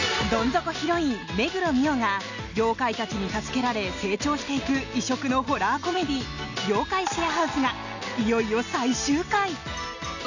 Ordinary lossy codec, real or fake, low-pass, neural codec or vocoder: none; real; 7.2 kHz; none